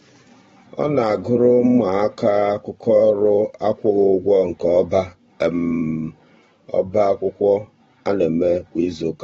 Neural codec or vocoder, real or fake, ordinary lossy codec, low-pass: vocoder, 44.1 kHz, 128 mel bands every 512 samples, BigVGAN v2; fake; AAC, 24 kbps; 19.8 kHz